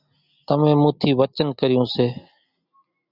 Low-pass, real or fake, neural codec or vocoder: 5.4 kHz; real; none